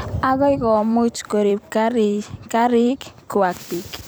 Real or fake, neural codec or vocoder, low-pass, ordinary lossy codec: fake; vocoder, 44.1 kHz, 128 mel bands every 256 samples, BigVGAN v2; none; none